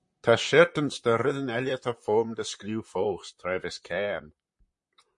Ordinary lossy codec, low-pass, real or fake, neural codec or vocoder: MP3, 48 kbps; 10.8 kHz; fake; vocoder, 44.1 kHz, 128 mel bands, Pupu-Vocoder